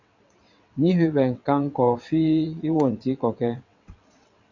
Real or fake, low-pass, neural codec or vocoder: fake; 7.2 kHz; vocoder, 22.05 kHz, 80 mel bands, WaveNeXt